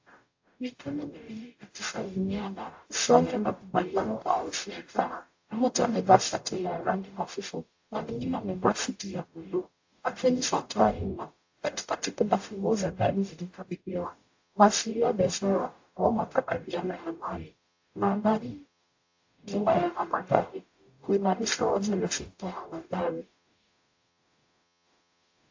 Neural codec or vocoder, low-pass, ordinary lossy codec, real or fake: codec, 44.1 kHz, 0.9 kbps, DAC; 7.2 kHz; AAC, 48 kbps; fake